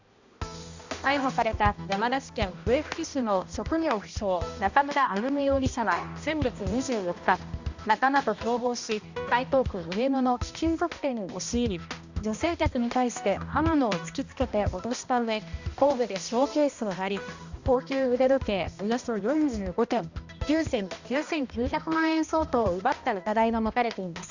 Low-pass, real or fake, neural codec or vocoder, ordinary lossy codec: 7.2 kHz; fake; codec, 16 kHz, 1 kbps, X-Codec, HuBERT features, trained on balanced general audio; Opus, 64 kbps